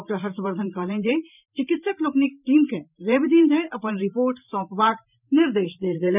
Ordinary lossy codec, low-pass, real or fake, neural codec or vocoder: none; 3.6 kHz; fake; vocoder, 44.1 kHz, 128 mel bands every 512 samples, BigVGAN v2